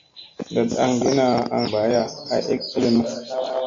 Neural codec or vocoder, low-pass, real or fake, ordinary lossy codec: none; 7.2 kHz; real; AAC, 48 kbps